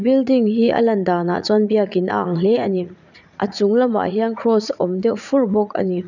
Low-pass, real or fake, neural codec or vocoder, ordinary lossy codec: 7.2 kHz; fake; vocoder, 44.1 kHz, 80 mel bands, Vocos; none